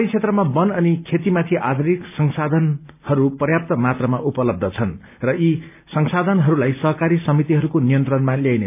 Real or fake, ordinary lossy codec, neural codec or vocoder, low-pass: real; none; none; 3.6 kHz